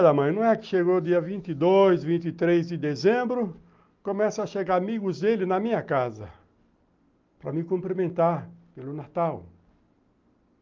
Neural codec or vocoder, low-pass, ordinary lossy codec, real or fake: none; 7.2 kHz; Opus, 24 kbps; real